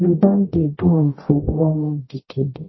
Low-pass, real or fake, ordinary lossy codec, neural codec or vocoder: 7.2 kHz; fake; MP3, 24 kbps; codec, 44.1 kHz, 0.9 kbps, DAC